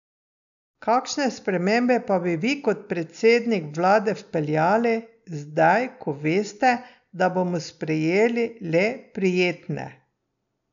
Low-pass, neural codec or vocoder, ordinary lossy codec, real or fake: 7.2 kHz; none; none; real